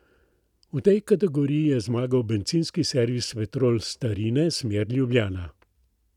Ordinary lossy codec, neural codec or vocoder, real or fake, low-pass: none; none; real; 19.8 kHz